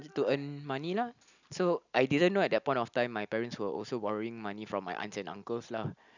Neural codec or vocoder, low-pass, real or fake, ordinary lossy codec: none; 7.2 kHz; real; none